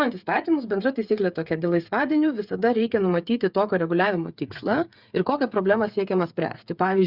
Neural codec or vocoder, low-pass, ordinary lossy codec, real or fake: codec, 16 kHz, 8 kbps, FreqCodec, smaller model; 5.4 kHz; Opus, 64 kbps; fake